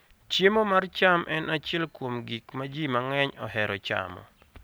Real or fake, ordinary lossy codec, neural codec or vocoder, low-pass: real; none; none; none